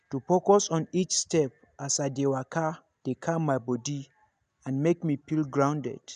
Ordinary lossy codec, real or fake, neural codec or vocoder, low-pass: none; real; none; 9.9 kHz